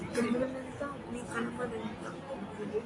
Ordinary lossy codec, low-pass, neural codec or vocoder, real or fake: AAC, 32 kbps; 10.8 kHz; none; real